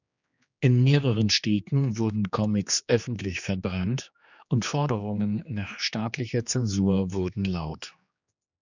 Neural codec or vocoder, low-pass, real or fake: codec, 16 kHz, 2 kbps, X-Codec, HuBERT features, trained on general audio; 7.2 kHz; fake